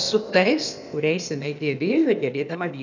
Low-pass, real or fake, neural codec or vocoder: 7.2 kHz; fake; codec, 16 kHz, 0.8 kbps, ZipCodec